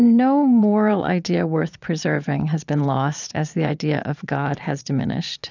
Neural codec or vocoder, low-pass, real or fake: vocoder, 22.05 kHz, 80 mel bands, Vocos; 7.2 kHz; fake